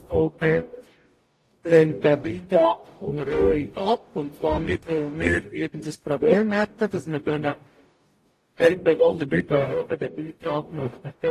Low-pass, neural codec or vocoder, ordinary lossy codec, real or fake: 14.4 kHz; codec, 44.1 kHz, 0.9 kbps, DAC; AAC, 48 kbps; fake